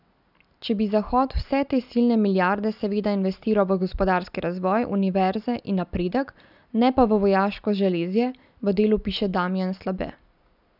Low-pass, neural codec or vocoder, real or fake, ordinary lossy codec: 5.4 kHz; none; real; none